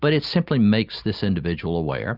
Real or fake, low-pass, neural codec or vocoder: real; 5.4 kHz; none